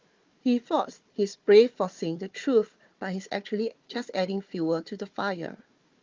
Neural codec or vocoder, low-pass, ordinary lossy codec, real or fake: codec, 16 kHz, 4 kbps, FunCodec, trained on Chinese and English, 50 frames a second; 7.2 kHz; Opus, 32 kbps; fake